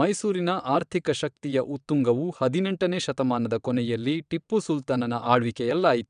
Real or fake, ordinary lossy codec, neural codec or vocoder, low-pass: fake; none; vocoder, 22.05 kHz, 80 mel bands, WaveNeXt; 9.9 kHz